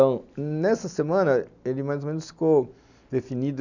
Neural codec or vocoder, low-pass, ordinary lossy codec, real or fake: none; 7.2 kHz; none; real